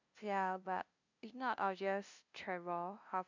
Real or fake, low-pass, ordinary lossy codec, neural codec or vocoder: fake; 7.2 kHz; MP3, 64 kbps; codec, 16 kHz, 0.5 kbps, FunCodec, trained on LibriTTS, 25 frames a second